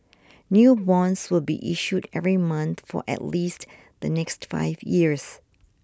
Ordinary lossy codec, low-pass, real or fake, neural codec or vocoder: none; none; real; none